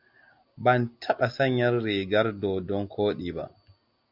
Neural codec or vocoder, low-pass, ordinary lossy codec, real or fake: none; 5.4 kHz; MP3, 48 kbps; real